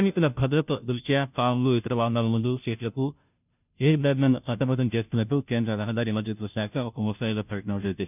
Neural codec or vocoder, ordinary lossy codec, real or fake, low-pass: codec, 16 kHz, 0.5 kbps, FunCodec, trained on Chinese and English, 25 frames a second; none; fake; 3.6 kHz